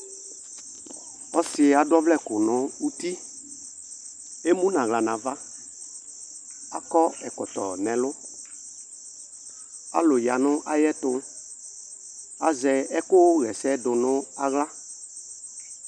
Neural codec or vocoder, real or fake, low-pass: none; real; 9.9 kHz